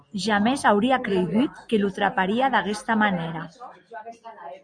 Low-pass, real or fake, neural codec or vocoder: 9.9 kHz; real; none